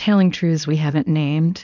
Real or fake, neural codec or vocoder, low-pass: fake; codec, 24 kHz, 0.9 kbps, WavTokenizer, small release; 7.2 kHz